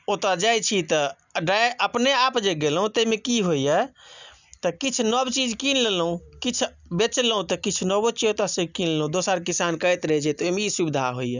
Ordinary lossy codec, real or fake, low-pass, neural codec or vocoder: none; real; 7.2 kHz; none